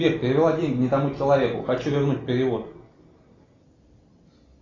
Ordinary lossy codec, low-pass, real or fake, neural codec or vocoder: AAC, 32 kbps; 7.2 kHz; real; none